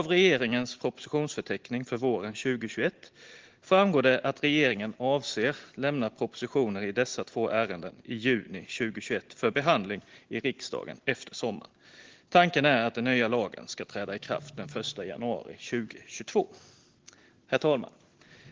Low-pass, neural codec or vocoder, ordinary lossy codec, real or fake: 7.2 kHz; none; Opus, 16 kbps; real